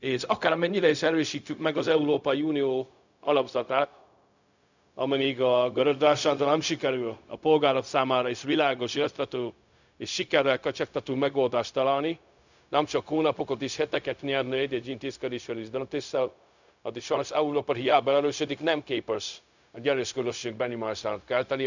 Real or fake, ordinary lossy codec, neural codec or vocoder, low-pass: fake; none; codec, 16 kHz, 0.4 kbps, LongCat-Audio-Codec; 7.2 kHz